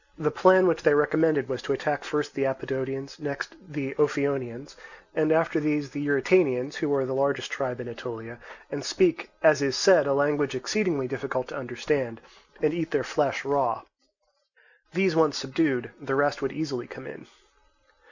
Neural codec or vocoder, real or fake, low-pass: none; real; 7.2 kHz